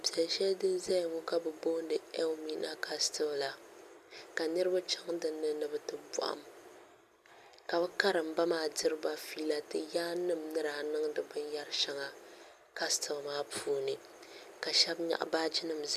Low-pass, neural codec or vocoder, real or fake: 14.4 kHz; none; real